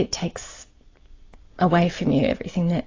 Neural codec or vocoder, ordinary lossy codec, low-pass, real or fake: none; AAC, 32 kbps; 7.2 kHz; real